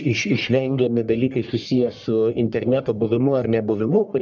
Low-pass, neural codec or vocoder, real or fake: 7.2 kHz; codec, 44.1 kHz, 1.7 kbps, Pupu-Codec; fake